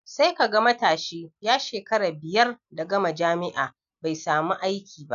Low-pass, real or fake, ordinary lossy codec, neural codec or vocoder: 7.2 kHz; real; none; none